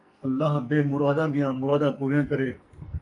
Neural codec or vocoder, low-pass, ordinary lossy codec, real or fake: codec, 44.1 kHz, 2.6 kbps, SNAC; 10.8 kHz; AAC, 48 kbps; fake